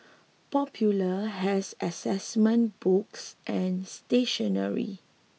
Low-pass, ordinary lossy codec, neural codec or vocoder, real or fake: none; none; none; real